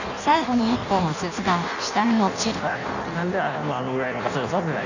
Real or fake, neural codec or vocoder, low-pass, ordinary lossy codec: fake; codec, 16 kHz in and 24 kHz out, 0.6 kbps, FireRedTTS-2 codec; 7.2 kHz; none